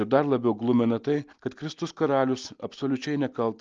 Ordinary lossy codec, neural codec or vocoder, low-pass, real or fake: Opus, 32 kbps; none; 7.2 kHz; real